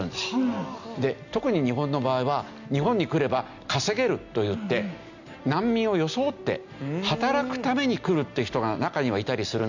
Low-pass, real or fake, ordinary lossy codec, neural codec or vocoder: 7.2 kHz; real; none; none